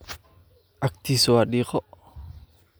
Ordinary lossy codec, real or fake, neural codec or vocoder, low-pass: none; real; none; none